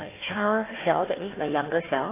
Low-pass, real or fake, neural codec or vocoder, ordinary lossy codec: 3.6 kHz; fake; codec, 16 kHz, 1 kbps, FunCodec, trained on Chinese and English, 50 frames a second; AAC, 16 kbps